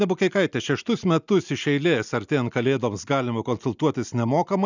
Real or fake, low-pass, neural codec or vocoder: real; 7.2 kHz; none